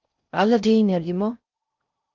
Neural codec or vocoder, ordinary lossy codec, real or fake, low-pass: codec, 16 kHz in and 24 kHz out, 0.6 kbps, FocalCodec, streaming, 4096 codes; Opus, 32 kbps; fake; 7.2 kHz